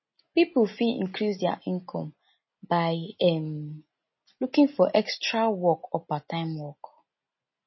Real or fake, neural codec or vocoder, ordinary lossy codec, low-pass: real; none; MP3, 24 kbps; 7.2 kHz